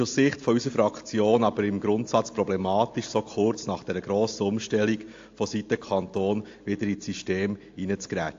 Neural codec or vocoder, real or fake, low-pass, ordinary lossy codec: none; real; 7.2 kHz; AAC, 48 kbps